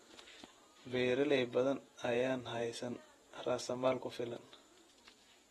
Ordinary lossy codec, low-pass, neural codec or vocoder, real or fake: AAC, 32 kbps; 19.8 kHz; vocoder, 48 kHz, 128 mel bands, Vocos; fake